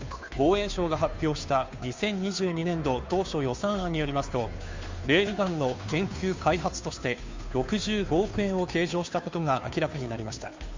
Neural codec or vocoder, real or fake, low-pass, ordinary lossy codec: codec, 16 kHz, 2 kbps, FunCodec, trained on Chinese and English, 25 frames a second; fake; 7.2 kHz; MP3, 64 kbps